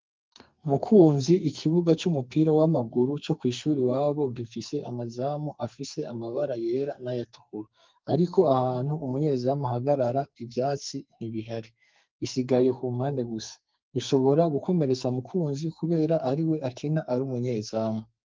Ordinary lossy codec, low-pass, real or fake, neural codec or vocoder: Opus, 32 kbps; 7.2 kHz; fake; codec, 44.1 kHz, 2.6 kbps, SNAC